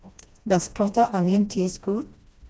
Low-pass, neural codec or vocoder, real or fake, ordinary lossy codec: none; codec, 16 kHz, 1 kbps, FreqCodec, smaller model; fake; none